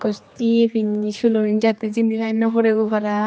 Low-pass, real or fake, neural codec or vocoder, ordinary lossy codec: none; fake; codec, 16 kHz, 2 kbps, X-Codec, HuBERT features, trained on general audio; none